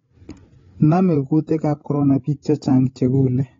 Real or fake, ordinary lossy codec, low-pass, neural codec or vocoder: fake; AAC, 24 kbps; 7.2 kHz; codec, 16 kHz, 8 kbps, FreqCodec, larger model